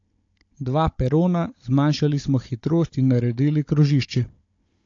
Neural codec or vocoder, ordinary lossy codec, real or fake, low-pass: codec, 16 kHz, 16 kbps, FunCodec, trained on Chinese and English, 50 frames a second; AAC, 48 kbps; fake; 7.2 kHz